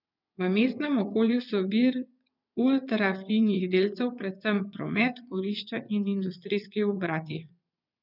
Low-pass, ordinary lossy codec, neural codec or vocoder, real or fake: 5.4 kHz; none; vocoder, 22.05 kHz, 80 mel bands, WaveNeXt; fake